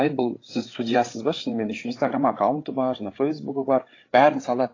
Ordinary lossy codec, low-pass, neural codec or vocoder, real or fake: AAC, 32 kbps; 7.2 kHz; codec, 16 kHz, 8 kbps, FreqCodec, larger model; fake